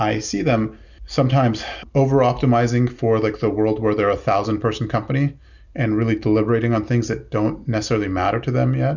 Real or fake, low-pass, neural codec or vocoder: real; 7.2 kHz; none